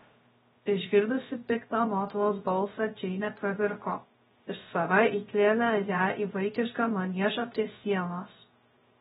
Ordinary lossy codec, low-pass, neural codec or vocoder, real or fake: AAC, 16 kbps; 7.2 kHz; codec, 16 kHz, 0.3 kbps, FocalCodec; fake